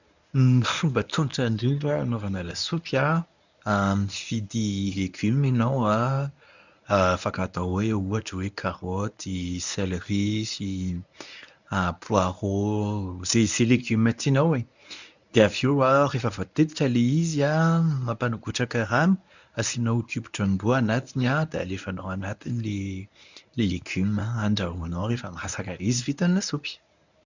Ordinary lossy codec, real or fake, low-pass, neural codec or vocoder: none; fake; 7.2 kHz; codec, 24 kHz, 0.9 kbps, WavTokenizer, medium speech release version 1